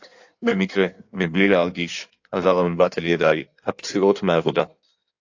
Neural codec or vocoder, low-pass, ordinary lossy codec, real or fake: codec, 16 kHz in and 24 kHz out, 1.1 kbps, FireRedTTS-2 codec; 7.2 kHz; AAC, 48 kbps; fake